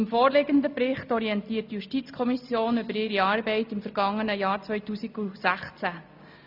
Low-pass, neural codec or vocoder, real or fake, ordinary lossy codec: 5.4 kHz; none; real; MP3, 48 kbps